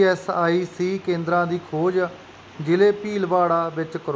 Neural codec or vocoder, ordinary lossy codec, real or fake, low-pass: none; none; real; none